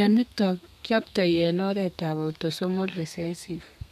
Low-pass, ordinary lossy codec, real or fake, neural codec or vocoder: 14.4 kHz; none; fake; codec, 32 kHz, 1.9 kbps, SNAC